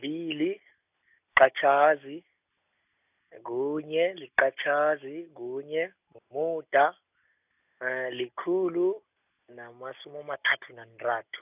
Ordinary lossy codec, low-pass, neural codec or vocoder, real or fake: none; 3.6 kHz; none; real